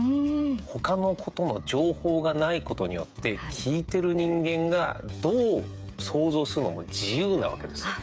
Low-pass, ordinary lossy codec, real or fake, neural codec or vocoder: none; none; fake; codec, 16 kHz, 8 kbps, FreqCodec, smaller model